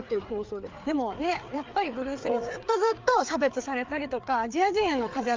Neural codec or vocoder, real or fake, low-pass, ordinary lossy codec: codec, 16 kHz, 4 kbps, FreqCodec, larger model; fake; 7.2 kHz; Opus, 24 kbps